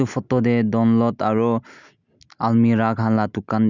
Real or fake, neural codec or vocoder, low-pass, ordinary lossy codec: real; none; 7.2 kHz; none